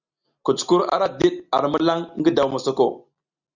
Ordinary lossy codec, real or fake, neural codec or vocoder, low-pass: Opus, 64 kbps; real; none; 7.2 kHz